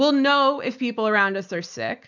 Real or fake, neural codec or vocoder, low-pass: real; none; 7.2 kHz